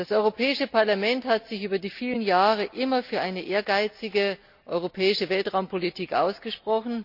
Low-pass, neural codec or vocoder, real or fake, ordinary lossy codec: 5.4 kHz; none; real; none